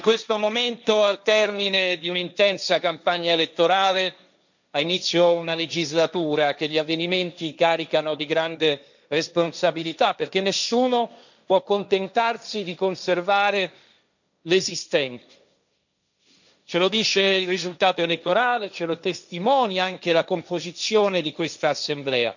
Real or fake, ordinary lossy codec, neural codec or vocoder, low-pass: fake; none; codec, 16 kHz, 1.1 kbps, Voila-Tokenizer; 7.2 kHz